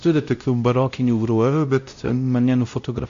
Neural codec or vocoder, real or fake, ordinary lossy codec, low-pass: codec, 16 kHz, 0.5 kbps, X-Codec, WavLM features, trained on Multilingual LibriSpeech; fake; AAC, 64 kbps; 7.2 kHz